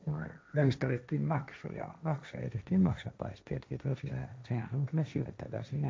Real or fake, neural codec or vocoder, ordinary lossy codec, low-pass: fake; codec, 16 kHz, 1.1 kbps, Voila-Tokenizer; none; none